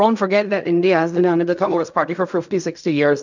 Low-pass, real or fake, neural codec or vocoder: 7.2 kHz; fake; codec, 16 kHz in and 24 kHz out, 0.4 kbps, LongCat-Audio-Codec, fine tuned four codebook decoder